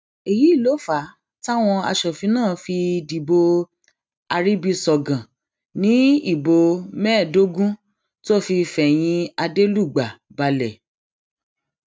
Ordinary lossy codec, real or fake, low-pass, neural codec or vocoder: none; real; none; none